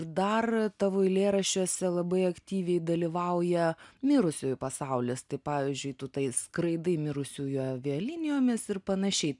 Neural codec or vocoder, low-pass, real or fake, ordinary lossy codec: none; 10.8 kHz; real; MP3, 96 kbps